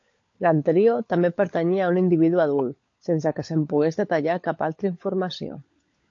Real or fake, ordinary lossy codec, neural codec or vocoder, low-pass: fake; AAC, 48 kbps; codec, 16 kHz, 16 kbps, FunCodec, trained on LibriTTS, 50 frames a second; 7.2 kHz